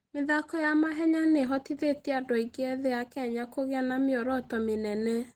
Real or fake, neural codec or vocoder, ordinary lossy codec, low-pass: real; none; Opus, 16 kbps; 19.8 kHz